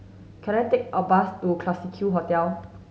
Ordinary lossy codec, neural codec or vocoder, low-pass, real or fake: none; none; none; real